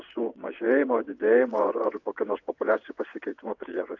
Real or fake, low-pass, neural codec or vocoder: fake; 7.2 kHz; vocoder, 22.05 kHz, 80 mel bands, WaveNeXt